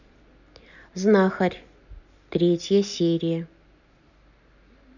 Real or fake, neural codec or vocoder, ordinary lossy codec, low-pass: real; none; none; 7.2 kHz